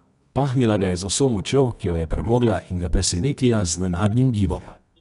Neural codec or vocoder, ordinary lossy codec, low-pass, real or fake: codec, 24 kHz, 0.9 kbps, WavTokenizer, medium music audio release; none; 10.8 kHz; fake